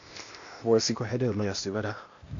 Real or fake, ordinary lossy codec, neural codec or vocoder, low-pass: fake; AAC, 48 kbps; codec, 16 kHz, 0.8 kbps, ZipCodec; 7.2 kHz